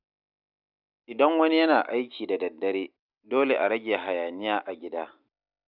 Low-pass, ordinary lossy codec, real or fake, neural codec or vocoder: 5.4 kHz; none; real; none